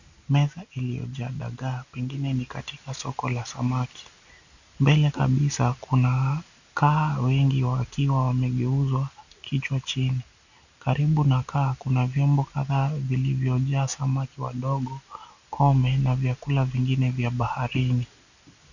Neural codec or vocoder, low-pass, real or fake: none; 7.2 kHz; real